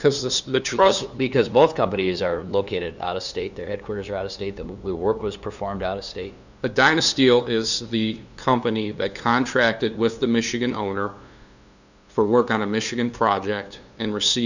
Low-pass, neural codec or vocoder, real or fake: 7.2 kHz; codec, 16 kHz, 2 kbps, FunCodec, trained on LibriTTS, 25 frames a second; fake